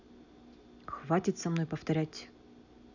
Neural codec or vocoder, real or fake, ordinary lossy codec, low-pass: none; real; none; 7.2 kHz